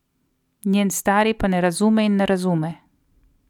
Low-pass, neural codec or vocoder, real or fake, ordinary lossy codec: 19.8 kHz; none; real; none